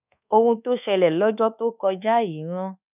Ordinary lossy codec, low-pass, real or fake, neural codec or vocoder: none; 3.6 kHz; fake; codec, 24 kHz, 1.2 kbps, DualCodec